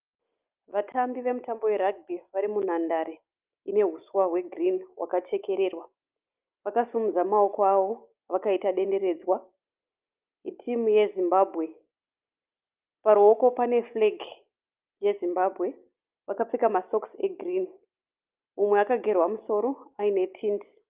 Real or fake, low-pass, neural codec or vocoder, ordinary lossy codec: real; 3.6 kHz; none; Opus, 24 kbps